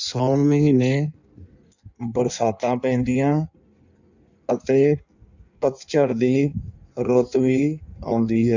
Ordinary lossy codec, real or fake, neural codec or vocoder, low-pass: none; fake; codec, 16 kHz in and 24 kHz out, 1.1 kbps, FireRedTTS-2 codec; 7.2 kHz